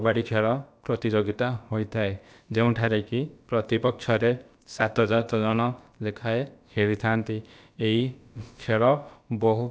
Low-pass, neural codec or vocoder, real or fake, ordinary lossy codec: none; codec, 16 kHz, about 1 kbps, DyCAST, with the encoder's durations; fake; none